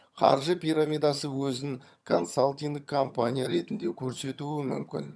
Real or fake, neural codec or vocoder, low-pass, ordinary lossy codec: fake; vocoder, 22.05 kHz, 80 mel bands, HiFi-GAN; none; none